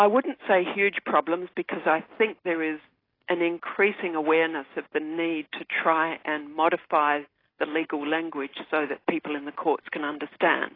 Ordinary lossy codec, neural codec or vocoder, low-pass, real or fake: AAC, 24 kbps; none; 5.4 kHz; real